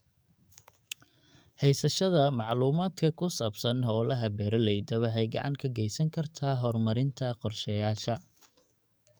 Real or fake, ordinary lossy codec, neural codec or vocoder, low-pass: fake; none; codec, 44.1 kHz, 7.8 kbps, DAC; none